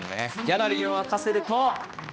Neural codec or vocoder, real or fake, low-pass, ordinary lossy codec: codec, 16 kHz, 1 kbps, X-Codec, HuBERT features, trained on balanced general audio; fake; none; none